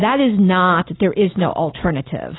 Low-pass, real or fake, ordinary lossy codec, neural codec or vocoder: 7.2 kHz; real; AAC, 16 kbps; none